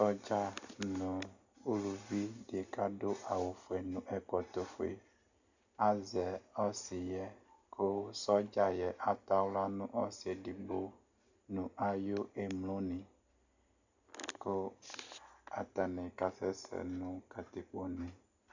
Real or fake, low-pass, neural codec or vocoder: real; 7.2 kHz; none